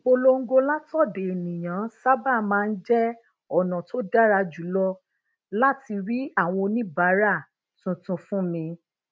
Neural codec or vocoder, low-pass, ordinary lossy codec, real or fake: none; none; none; real